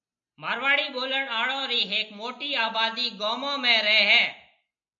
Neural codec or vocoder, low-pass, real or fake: none; 7.2 kHz; real